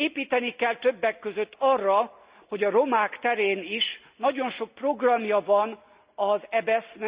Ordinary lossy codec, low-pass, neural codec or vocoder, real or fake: Opus, 64 kbps; 3.6 kHz; none; real